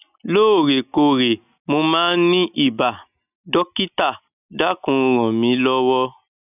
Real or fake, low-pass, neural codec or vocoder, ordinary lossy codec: real; 3.6 kHz; none; none